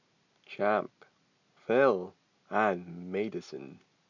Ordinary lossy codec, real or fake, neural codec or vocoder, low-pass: none; real; none; 7.2 kHz